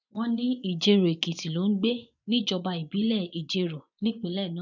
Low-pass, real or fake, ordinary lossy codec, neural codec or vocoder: 7.2 kHz; fake; none; vocoder, 24 kHz, 100 mel bands, Vocos